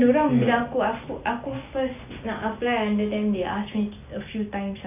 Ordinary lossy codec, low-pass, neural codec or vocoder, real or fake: none; 3.6 kHz; none; real